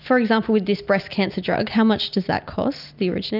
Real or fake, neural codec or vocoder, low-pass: real; none; 5.4 kHz